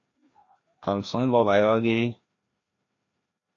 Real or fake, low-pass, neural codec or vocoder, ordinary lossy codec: fake; 7.2 kHz; codec, 16 kHz, 1 kbps, FreqCodec, larger model; AAC, 32 kbps